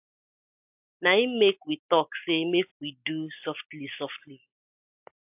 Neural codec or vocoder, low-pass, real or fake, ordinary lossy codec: none; 3.6 kHz; real; AAC, 32 kbps